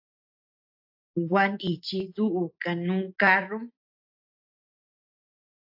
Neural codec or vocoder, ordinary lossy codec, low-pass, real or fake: none; AAC, 48 kbps; 5.4 kHz; real